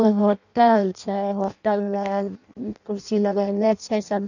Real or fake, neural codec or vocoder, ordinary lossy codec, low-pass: fake; codec, 16 kHz in and 24 kHz out, 0.6 kbps, FireRedTTS-2 codec; none; 7.2 kHz